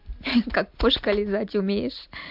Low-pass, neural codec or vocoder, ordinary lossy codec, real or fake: 5.4 kHz; none; MP3, 48 kbps; real